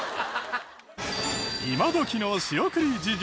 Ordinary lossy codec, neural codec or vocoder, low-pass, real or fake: none; none; none; real